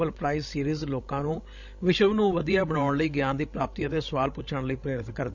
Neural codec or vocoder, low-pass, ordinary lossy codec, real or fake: codec, 16 kHz, 16 kbps, FreqCodec, larger model; 7.2 kHz; none; fake